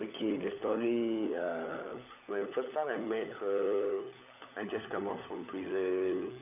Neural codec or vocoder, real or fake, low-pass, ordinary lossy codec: codec, 16 kHz, 16 kbps, FunCodec, trained on LibriTTS, 50 frames a second; fake; 3.6 kHz; none